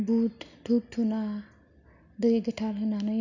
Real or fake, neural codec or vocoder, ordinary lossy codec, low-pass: real; none; none; 7.2 kHz